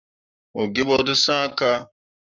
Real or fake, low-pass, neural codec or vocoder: fake; 7.2 kHz; codec, 44.1 kHz, 7.8 kbps, Pupu-Codec